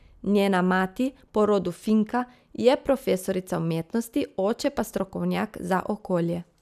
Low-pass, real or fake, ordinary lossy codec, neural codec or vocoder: 14.4 kHz; real; none; none